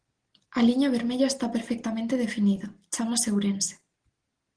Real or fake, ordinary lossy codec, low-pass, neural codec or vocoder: real; Opus, 16 kbps; 9.9 kHz; none